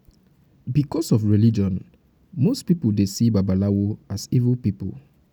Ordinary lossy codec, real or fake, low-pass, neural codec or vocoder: none; real; none; none